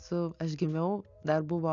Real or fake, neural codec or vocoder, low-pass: real; none; 7.2 kHz